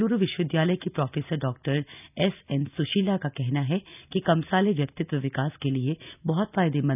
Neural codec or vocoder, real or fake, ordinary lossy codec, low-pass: none; real; none; 3.6 kHz